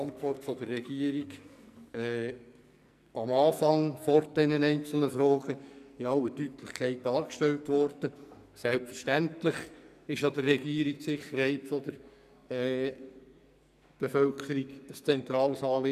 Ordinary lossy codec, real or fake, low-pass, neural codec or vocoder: none; fake; 14.4 kHz; codec, 44.1 kHz, 2.6 kbps, SNAC